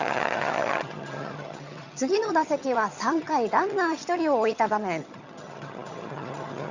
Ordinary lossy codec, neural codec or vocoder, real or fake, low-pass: Opus, 64 kbps; vocoder, 22.05 kHz, 80 mel bands, HiFi-GAN; fake; 7.2 kHz